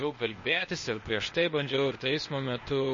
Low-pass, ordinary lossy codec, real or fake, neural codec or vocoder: 7.2 kHz; MP3, 32 kbps; fake; codec, 16 kHz, 0.8 kbps, ZipCodec